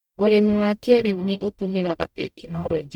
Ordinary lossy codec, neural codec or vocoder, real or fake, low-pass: MP3, 96 kbps; codec, 44.1 kHz, 0.9 kbps, DAC; fake; 19.8 kHz